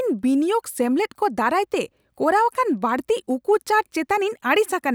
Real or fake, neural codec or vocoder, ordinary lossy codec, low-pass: real; none; none; none